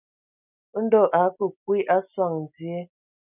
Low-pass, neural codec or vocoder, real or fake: 3.6 kHz; none; real